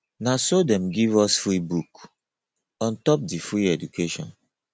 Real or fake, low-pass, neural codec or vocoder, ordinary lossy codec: real; none; none; none